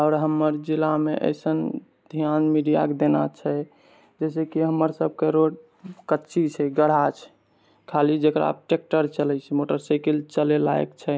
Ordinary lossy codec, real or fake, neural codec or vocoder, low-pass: none; real; none; none